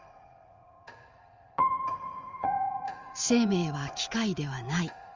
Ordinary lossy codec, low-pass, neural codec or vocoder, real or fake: Opus, 32 kbps; 7.2 kHz; vocoder, 44.1 kHz, 80 mel bands, Vocos; fake